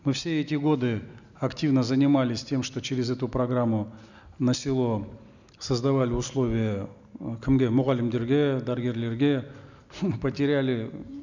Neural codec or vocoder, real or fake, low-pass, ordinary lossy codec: none; real; 7.2 kHz; none